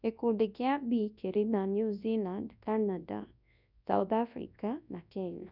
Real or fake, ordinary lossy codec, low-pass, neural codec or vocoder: fake; none; 5.4 kHz; codec, 24 kHz, 0.9 kbps, WavTokenizer, large speech release